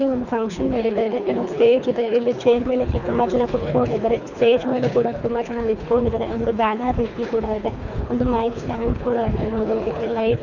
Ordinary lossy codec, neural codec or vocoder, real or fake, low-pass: none; codec, 24 kHz, 3 kbps, HILCodec; fake; 7.2 kHz